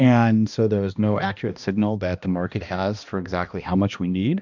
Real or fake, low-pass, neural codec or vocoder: fake; 7.2 kHz; codec, 16 kHz, 1 kbps, X-Codec, HuBERT features, trained on balanced general audio